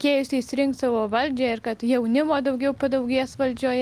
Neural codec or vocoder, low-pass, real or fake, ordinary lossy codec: none; 14.4 kHz; real; Opus, 24 kbps